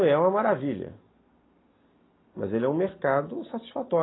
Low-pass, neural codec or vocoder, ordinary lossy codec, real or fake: 7.2 kHz; none; AAC, 16 kbps; real